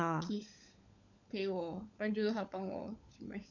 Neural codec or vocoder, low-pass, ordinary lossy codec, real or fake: codec, 16 kHz, 16 kbps, FunCodec, trained on LibriTTS, 50 frames a second; 7.2 kHz; none; fake